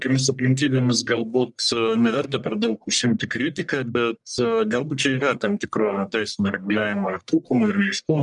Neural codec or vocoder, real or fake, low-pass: codec, 44.1 kHz, 1.7 kbps, Pupu-Codec; fake; 10.8 kHz